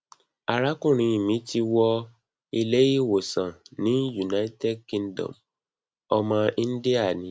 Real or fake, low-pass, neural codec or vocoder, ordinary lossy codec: real; none; none; none